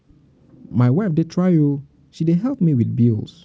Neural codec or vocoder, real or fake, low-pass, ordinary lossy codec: none; real; none; none